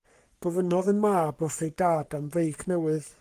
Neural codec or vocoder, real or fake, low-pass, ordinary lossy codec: codec, 44.1 kHz, 3.4 kbps, Pupu-Codec; fake; 14.4 kHz; Opus, 24 kbps